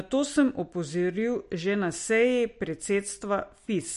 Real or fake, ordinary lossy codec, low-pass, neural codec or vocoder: real; MP3, 48 kbps; 14.4 kHz; none